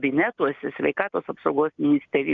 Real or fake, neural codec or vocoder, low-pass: real; none; 7.2 kHz